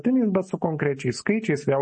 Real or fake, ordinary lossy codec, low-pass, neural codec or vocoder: real; MP3, 32 kbps; 9.9 kHz; none